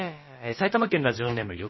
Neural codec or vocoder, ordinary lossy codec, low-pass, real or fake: codec, 16 kHz, about 1 kbps, DyCAST, with the encoder's durations; MP3, 24 kbps; 7.2 kHz; fake